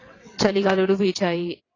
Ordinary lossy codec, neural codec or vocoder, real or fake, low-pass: AAC, 32 kbps; vocoder, 22.05 kHz, 80 mel bands, WaveNeXt; fake; 7.2 kHz